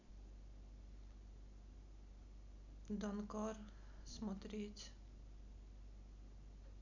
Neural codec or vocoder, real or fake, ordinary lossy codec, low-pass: none; real; none; 7.2 kHz